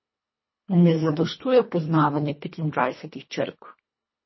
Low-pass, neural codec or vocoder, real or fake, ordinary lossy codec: 7.2 kHz; codec, 24 kHz, 1.5 kbps, HILCodec; fake; MP3, 24 kbps